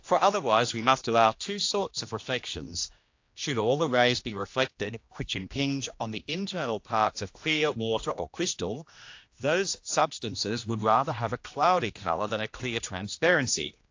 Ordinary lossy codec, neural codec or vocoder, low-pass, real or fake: AAC, 48 kbps; codec, 16 kHz, 1 kbps, X-Codec, HuBERT features, trained on general audio; 7.2 kHz; fake